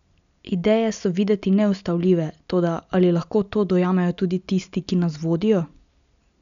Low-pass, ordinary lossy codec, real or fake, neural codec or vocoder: 7.2 kHz; none; real; none